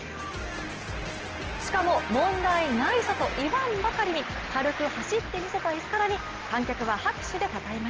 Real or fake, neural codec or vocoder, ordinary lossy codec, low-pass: real; none; Opus, 16 kbps; 7.2 kHz